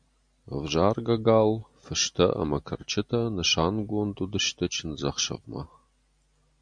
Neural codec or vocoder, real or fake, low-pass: none; real; 9.9 kHz